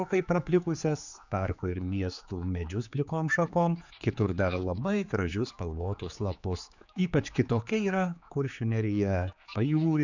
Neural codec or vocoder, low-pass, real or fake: codec, 16 kHz, 4 kbps, X-Codec, HuBERT features, trained on general audio; 7.2 kHz; fake